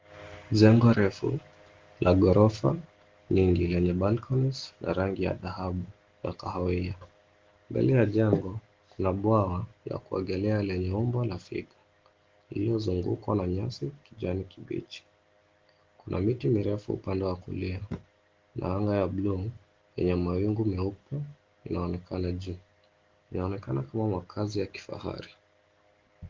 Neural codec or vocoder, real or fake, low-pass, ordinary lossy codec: none; real; 7.2 kHz; Opus, 16 kbps